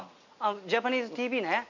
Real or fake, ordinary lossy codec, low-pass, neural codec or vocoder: real; none; 7.2 kHz; none